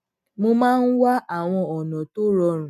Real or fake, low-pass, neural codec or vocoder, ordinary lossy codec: real; 14.4 kHz; none; none